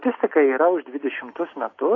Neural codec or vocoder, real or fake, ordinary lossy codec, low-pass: none; real; AAC, 32 kbps; 7.2 kHz